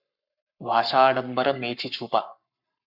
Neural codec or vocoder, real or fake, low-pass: codec, 44.1 kHz, 7.8 kbps, Pupu-Codec; fake; 5.4 kHz